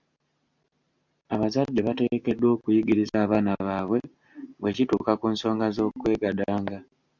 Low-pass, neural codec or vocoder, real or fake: 7.2 kHz; none; real